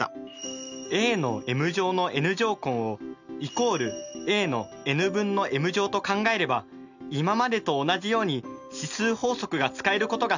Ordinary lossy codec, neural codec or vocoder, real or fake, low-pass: none; none; real; 7.2 kHz